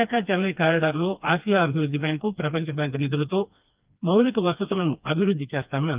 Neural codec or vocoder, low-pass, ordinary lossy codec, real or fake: codec, 16 kHz, 2 kbps, FreqCodec, smaller model; 3.6 kHz; Opus, 24 kbps; fake